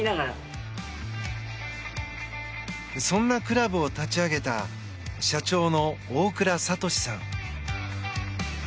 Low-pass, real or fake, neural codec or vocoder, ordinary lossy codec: none; real; none; none